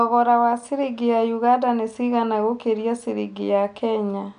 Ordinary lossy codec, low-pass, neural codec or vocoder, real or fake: none; 9.9 kHz; none; real